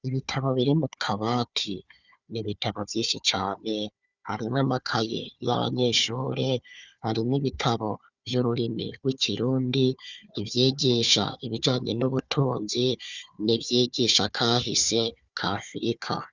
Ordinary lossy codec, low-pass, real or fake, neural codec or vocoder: Opus, 64 kbps; 7.2 kHz; fake; codec, 16 kHz, 4 kbps, FunCodec, trained on Chinese and English, 50 frames a second